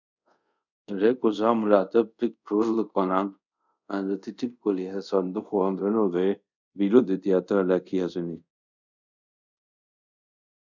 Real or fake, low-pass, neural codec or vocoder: fake; 7.2 kHz; codec, 24 kHz, 0.5 kbps, DualCodec